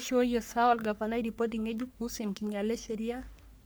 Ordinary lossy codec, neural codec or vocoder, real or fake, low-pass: none; codec, 44.1 kHz, 3.4 kbps, Pupu-Codec; fake; none